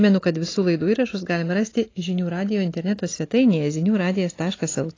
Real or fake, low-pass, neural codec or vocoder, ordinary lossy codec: real; 7.2 kHz; none; AAC, 32 kbps